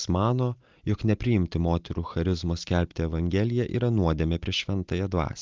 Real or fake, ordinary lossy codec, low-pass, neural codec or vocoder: real; Opus, 32 kbps; 7.2 kHz; none